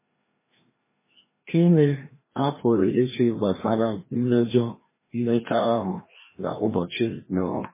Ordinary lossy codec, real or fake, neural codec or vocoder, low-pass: MP3, 16 kbps; fake; codec, 16 kHz, 1 kbps, FreqCodec, larger model; 3.6 kHz